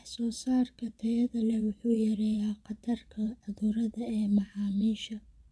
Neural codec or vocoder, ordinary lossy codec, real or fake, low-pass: vocoder, 22.05 kHz, 80 mel bands, Vocos; none; fake; none